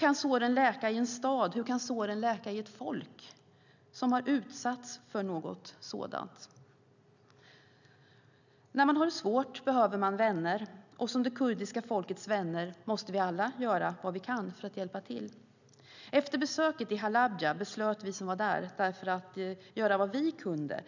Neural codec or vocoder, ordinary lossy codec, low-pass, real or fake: none; none; 7.2 kHz; real